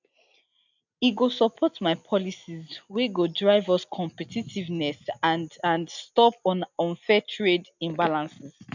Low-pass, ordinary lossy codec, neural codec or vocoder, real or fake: 7.2 kHz; none; none; real